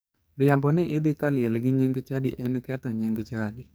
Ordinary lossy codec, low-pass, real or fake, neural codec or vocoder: none; none; fake; codec, 44.1 kHz, 2.6 kbps, SNAC